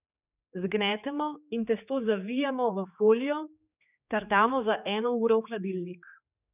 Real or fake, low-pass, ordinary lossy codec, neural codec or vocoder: fake; 3.6 kHz; none; codec, 16 kHz, 4 kbps, X-Codec, HuBERT features, trained on general audio